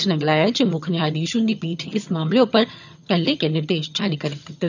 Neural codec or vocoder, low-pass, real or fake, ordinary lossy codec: vocoder, 22.05 kHz, 80 mel bands, HiFi-GAN; 7.2 kHz; fake; none